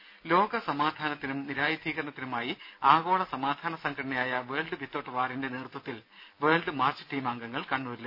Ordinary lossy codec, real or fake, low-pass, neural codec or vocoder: none; real; 5.4 kHz; none